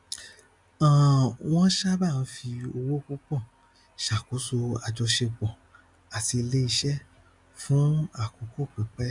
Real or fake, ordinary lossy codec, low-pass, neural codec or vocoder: real; none; 10.8 kHz; none